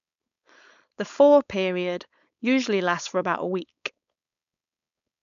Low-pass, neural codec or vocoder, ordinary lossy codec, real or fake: 7.2 kHz; codec, 16 kHz, 4.8 kbps, FACodec; none; fake